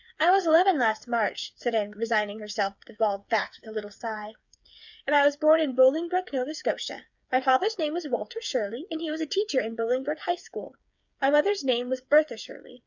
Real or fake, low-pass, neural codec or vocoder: fake; 7.2 kHz; codec, 16 kHz, 8 kbps, FreqCodec, smaller model